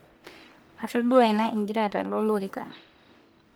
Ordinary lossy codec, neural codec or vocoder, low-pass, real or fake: none; codec, 44.1 kHz, 1.7 kbps, Pupu-Codec; none; fake